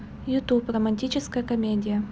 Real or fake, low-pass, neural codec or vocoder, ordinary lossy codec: real; none; none; none